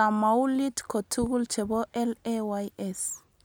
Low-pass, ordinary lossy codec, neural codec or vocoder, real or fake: none; none; none; real